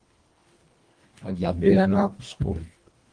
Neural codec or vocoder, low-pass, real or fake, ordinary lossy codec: codec, 24 kHz, 1.5 kbps, HILCodec; 9.9 kHz; fake; Opus, 24 kbps